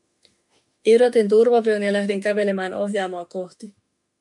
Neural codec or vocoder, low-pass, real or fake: autoencoder, 48 kHz, 32 numbers a frame, DAC-VAE, trained on Japanese speech; 10.8 kHz; fake